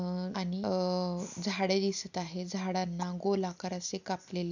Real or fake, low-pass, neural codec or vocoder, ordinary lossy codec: real; 7.2 kHz; none; none